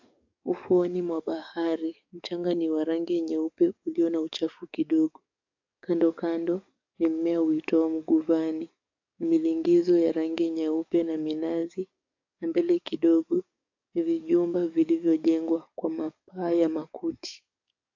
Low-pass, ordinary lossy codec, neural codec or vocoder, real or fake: 7.2 kHz; AAC, 48 kbps; codec, 44.1 kHz, 7.8 kbps, DAC; fake